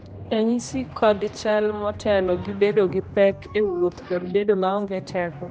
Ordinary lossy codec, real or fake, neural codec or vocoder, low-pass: none; fake; codec, 16 kHz, 1 kbps, X-Codec, HuBERT features, trained on general audio; none